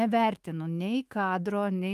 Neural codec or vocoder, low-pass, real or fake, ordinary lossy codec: autoencoder, 48 kHz, 128 numbers a frame, DAC-VAE, trained on Japanese speech; 14.4 kHz; fake; Opus, 32 kbps